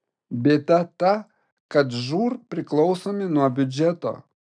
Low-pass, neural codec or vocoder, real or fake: 9.9 kHz; none; real